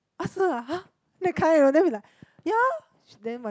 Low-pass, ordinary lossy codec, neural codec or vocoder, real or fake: none; none; none; real